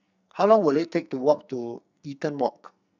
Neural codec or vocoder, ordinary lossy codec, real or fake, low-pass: codec, 44.1 kHz, 2.6 kbps, SNAC; none; fake; 7.2 kHz